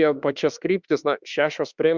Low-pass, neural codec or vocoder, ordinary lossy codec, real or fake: 7.2 kHz; autoencoder, 48 kHz, 32 numbers a frame, DAC-VAE, trained on Japanese speech; Opus, 64 kbps; fake